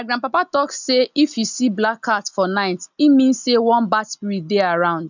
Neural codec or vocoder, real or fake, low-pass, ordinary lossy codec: none; real; 7.2 kHz; none